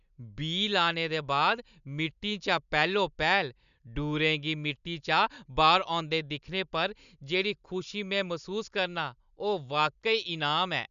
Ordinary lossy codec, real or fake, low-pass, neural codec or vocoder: none; real; 7.2 kHz; none